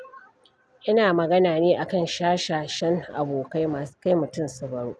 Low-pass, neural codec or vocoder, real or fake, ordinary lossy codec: none; none; real; none